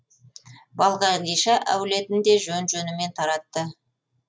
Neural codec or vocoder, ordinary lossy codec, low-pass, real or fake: none; none; none; real